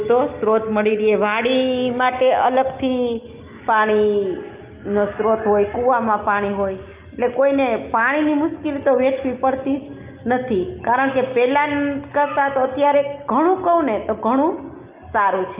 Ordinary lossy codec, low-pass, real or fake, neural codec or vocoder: Opus, 24 kbps; 3.6 kHz; real; none